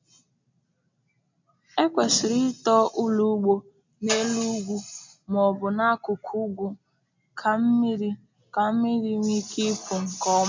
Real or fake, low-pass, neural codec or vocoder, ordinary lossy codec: real; 7.2 kHz; none; MP3, 64 kbps